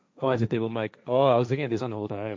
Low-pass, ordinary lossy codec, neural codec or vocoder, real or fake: none; none; codec, 16 kHz, 1.1 kbps, Voila-Tokenizer; fake